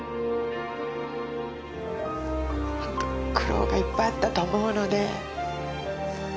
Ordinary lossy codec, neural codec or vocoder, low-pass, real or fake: none; none; none; real